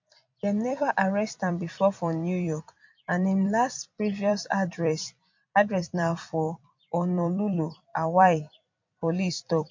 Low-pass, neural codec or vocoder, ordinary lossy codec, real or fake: 7.2 kHz; vocoder, 44.1 kHz, 128 mel bands every 256 samples, BigVGAN v2; MP3, 48 kbps; fake